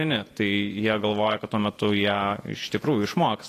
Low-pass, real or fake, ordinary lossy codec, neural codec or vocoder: 14.4 kHz; fake; AAC, 48 kbps; vocoder, 48 kHz, 128 mel bands, Vocos